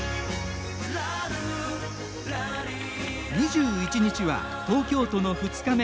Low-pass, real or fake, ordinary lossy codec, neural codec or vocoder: none; real; none; none